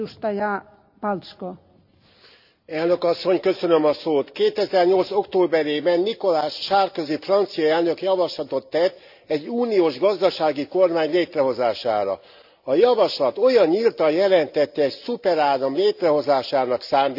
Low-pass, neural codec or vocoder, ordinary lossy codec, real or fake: 5.4 kHz; none; none; real